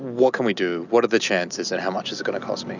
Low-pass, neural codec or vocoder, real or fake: 7.2 kHz; none; real